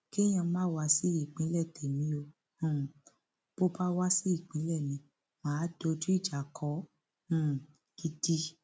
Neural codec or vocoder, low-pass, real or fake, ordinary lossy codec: none; none; real; none